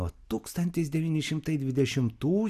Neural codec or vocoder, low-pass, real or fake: none; 14.4 kHz; real